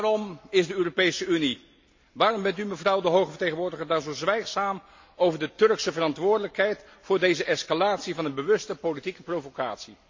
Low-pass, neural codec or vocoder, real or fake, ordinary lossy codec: 7.2 kHz; none; real; MP3, 48 kbps